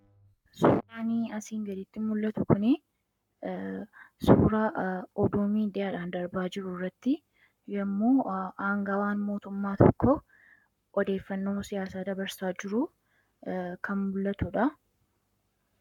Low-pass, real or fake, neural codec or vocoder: 19.8 kHz; fake; codec, 44.1 kHz, 7.8 kbps, Pupu-Codec